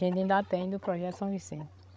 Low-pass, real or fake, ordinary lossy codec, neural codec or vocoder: none; fake; none; codec, 16 kHz, 16 kbps, FreqCodec, larger model